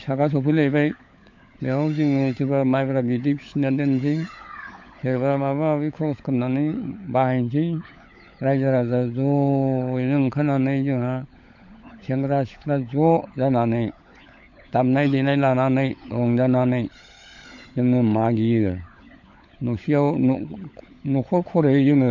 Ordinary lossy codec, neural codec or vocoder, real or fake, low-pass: MP3, 48 kbps; codec, 16 kHz, 16 kbps, FunCodec, trained on LibriTTS, 50 frames a second; fake; 7.2 kHz